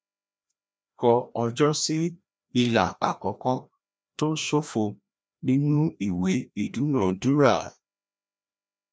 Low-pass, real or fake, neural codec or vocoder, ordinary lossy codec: none; fake; codec, 16 kHz, 1 kbps, FreqCodec, larger model; none